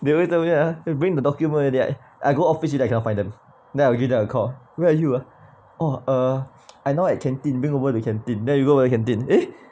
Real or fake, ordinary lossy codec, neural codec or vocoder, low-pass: real; none; none; none